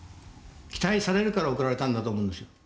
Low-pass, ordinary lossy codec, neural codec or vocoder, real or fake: none; none; none; real